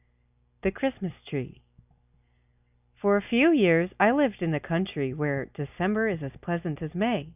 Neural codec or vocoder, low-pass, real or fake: none; 3.6 kHz; real